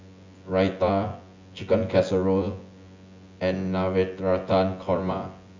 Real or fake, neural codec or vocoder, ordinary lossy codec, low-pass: fake; vocoder, 24 kHz, 100 mel bands, Vocos; AAC, 48 kbps; 7.2 kHz